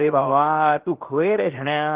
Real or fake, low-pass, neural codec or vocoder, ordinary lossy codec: fake; 3.6 kHz; codec, 16 kHz, 0.7 kbps, FocalCodec; Opus, 16 kbps